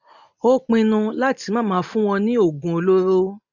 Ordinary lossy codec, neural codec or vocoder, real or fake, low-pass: none; none; real; 7.2 kHz